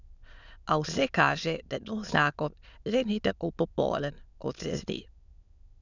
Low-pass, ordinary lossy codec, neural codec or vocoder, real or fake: 7.2 kHz; none; autoencoder, 22.05 kHz, a latent of 192 numbers a frame, VITS, trained on many speakers; fake